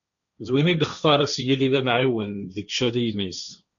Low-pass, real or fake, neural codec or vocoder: 7.2 kHz; fake; codec, 16 kHz, 1.1 kbps, Voila-Tokenizer